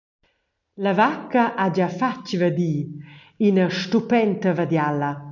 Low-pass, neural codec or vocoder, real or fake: 7.2 kHz; none; real